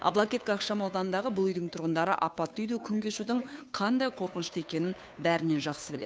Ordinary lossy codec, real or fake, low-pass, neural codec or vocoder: none; fake; none; codec, 16 kHz, 2 kbps, FunCodec, trained on Chinese and English, 25 frames a second